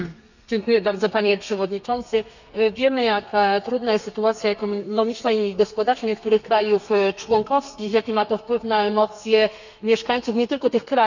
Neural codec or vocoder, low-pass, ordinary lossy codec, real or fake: codec, 32 kHz, 1.9 kbps, SNAC; 7.2 kHz; none; fake